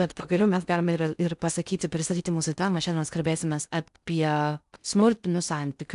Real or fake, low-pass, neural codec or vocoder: fake; 10.8 kHz; codec, 16 kHz in and 24 kHz out, 0.6 kbps, FocalCodec, streaming, 2048 codes